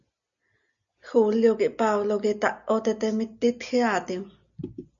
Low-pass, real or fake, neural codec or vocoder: 7.2 kHz; real; none